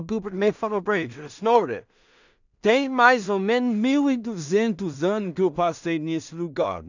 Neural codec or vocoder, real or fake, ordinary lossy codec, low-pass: codec, 16 kHz in and 24 kHz out, 0.4 kbps, LongCat-Audio-Codec, two codebook decoder; fake; none; 7.2 kHz